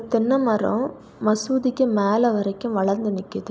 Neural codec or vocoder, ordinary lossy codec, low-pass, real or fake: none; none; none; real